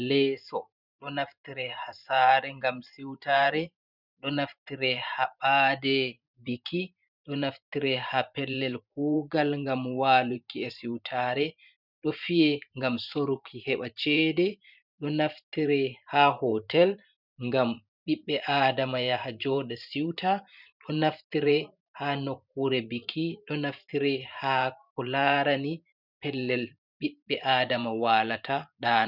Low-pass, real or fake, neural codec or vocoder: 5.4 kHz; fake; vocoder, 44.1 kHz, 128 mel bands every 512 samples, BigVGAN v2